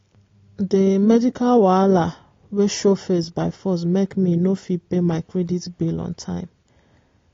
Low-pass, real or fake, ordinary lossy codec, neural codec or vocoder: 7.2 kHz; real; AAC, 32 kbps; none